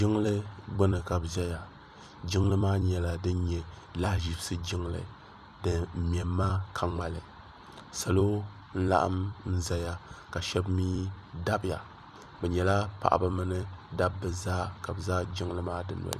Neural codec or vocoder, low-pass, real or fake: vocoder, 44.1 kHz, 128 mel bands every 256 samples, BigVGAN v2; 14.4 kHz; fake